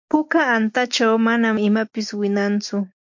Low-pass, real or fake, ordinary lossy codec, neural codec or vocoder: 7.2 kHz; real; MP3, 48 kbps; none